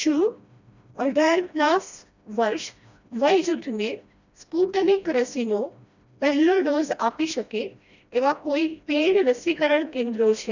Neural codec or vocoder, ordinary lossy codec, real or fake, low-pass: codec, 16 kHz, 1 kbps, FreqCodec, smaller model; none; fake; 7.2 kHz